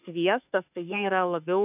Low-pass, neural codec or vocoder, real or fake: 3.6 kHz; autoencoder, 48 kHz, 32 numbers a frame, DAC-VAE, trained on Japanese speech; fake